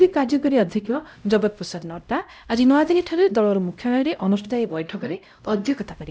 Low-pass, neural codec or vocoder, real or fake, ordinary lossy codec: none; codec, 16 kHz, 0.5 kbps, X-Codec, HuBERT features, trained on LibriSpeech; fake; none